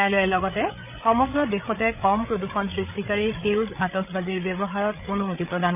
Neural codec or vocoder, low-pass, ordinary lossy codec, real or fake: codec, 16 kHz, 8 kbps, FreqCodec, larger model; 3.6 kHz; none; fake